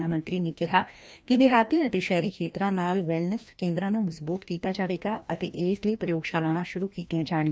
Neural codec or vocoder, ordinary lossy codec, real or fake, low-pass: codec, 16 kHz, 1 kbps, FreqCodec, larger model; none; fake; none